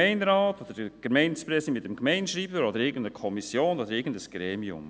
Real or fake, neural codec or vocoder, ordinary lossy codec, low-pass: real; none; none; none